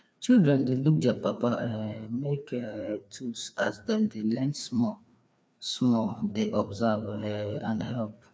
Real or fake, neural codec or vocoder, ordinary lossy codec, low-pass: fake; codec, 16 kHz, 2 kbps, FreqCodec, larger model; none; none